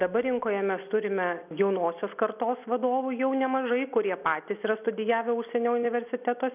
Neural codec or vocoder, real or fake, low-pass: none; real; 3.6 kHz